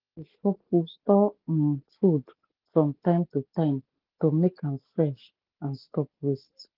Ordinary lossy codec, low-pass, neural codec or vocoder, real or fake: Opus, 16 kbps; 5.4 kHz; none; real